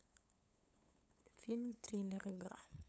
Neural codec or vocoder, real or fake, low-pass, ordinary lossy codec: codec, 16 kHz, 8 kbps, FunCodec, trained on LibriTTS, 25 frames a second; fake; none; none